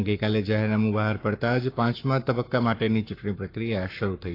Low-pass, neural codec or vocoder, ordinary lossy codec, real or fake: 5.4 kHz; codec, 44.1 kHz, 7.8 kbps, Pupu-Codec; AAC, 32 kbps; fake